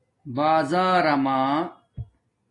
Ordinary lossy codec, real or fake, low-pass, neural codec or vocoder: AAC, 32 kbps; real; 10.8 kHz; none